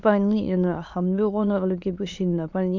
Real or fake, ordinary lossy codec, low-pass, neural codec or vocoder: fake; MP3, 64 kbps; 7.2 kHz; autoencoder, 22.05 kHz, a latent of 192 numbers a frame, VITS, trained on many speakers